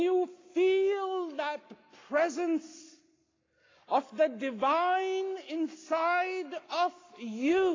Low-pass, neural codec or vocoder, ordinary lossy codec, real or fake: 7.2 kHz; codec, 44.1 kHz, 7.8 kbps, Pupu-Codec; AAC, 32 kbps; fake